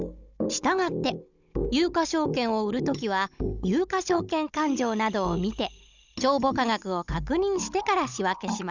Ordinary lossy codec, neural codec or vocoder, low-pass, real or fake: none; codec, 16 kHz, 16 kbps, FunCodec, trained on Chinese and English, 50 frames a second; 7.2 kHz; fake